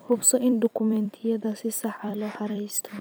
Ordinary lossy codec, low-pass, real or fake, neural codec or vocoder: none; none; fake; vocoder, 44.1 kHz, 128 mel bands every 256 samples, BigVGAN v2